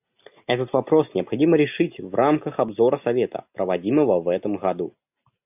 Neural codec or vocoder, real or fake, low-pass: none; real; 3.6 kHz